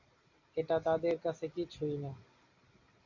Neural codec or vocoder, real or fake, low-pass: none; real; 7.2 kHz